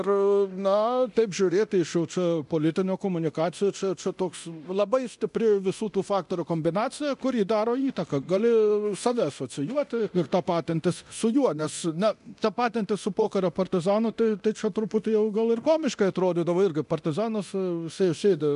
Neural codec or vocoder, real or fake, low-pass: codec, 24 kHz, 0.9 kbps, DualCodec; fake; 10.8 kHz